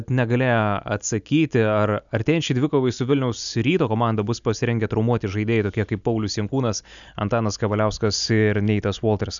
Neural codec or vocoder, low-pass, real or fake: none; 7.2 kHz; real